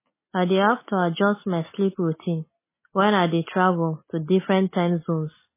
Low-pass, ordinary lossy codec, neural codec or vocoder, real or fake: 3.6 kHz; MP3, 16 kbps; none; real